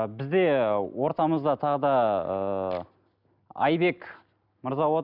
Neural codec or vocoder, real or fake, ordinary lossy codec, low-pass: none; real; none; 5.4 kHz